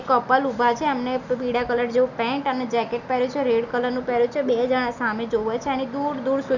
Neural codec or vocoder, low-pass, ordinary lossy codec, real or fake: none; 7.2 kHz; none; real